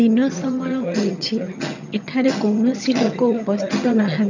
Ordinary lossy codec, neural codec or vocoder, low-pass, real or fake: none; vocoder, 22.05 kHz, 80 mel bands, HiFi-GAN; 7.2 kHz; fake